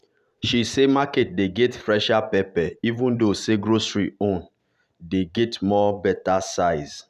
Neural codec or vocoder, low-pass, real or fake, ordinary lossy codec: vocoder, 44.1 kHz, 128 mel bands every 512 samples, BigVGAN v2; 14.4 kHz; fake; none